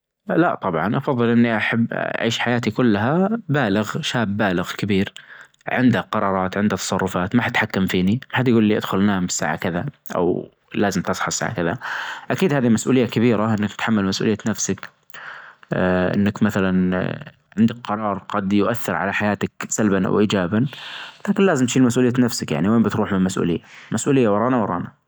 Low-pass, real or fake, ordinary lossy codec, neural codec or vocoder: none; real; none; none